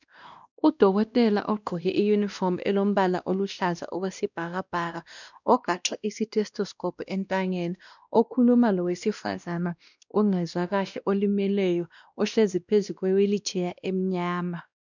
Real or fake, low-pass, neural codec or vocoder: fake; 7.2 kHz; codec, 16 kHz, 1 kbps, X-Codec, WavLM features, trained on Multilingual LibriSpeech